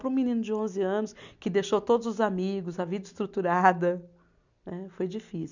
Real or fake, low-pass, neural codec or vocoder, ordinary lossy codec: real; 7.2 kHz; none; none